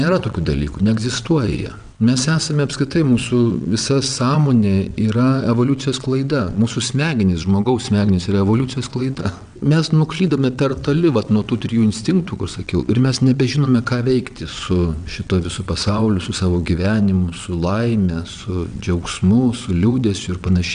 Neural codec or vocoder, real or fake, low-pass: vocoder, 22.05 kHz, 80 mel bands, WaveNeXt; fake; 9.9 kHz